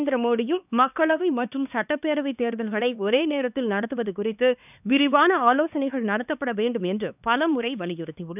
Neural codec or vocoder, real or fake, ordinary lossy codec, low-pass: codec, 16 kHz, 2 kbps, X-Codec, HuBERT features, trained on LibriSpeech; fake; none; 3.6 kHz